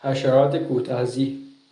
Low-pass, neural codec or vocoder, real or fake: 10.8 kHz; none; real